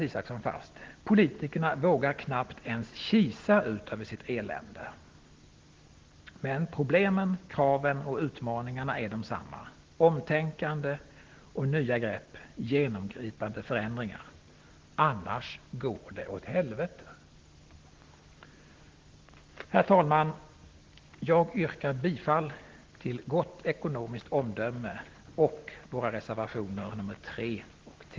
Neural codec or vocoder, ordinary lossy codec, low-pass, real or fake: none; Opus, 16 kbps; 7.2 kHz; real